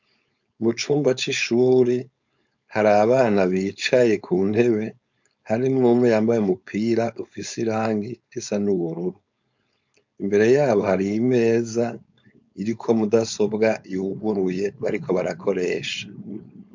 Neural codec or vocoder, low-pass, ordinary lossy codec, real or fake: codec, 16 kHz, 4.8 kbps, FACodec; 7.2 kHz; MP3, 64 kbps; fake